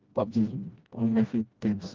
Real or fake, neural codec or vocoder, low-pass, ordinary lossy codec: fake; codec, 16 kHz, 1 kbps, FreqCodec, smaller model; 7.2 kHz; Opus, 24 kbps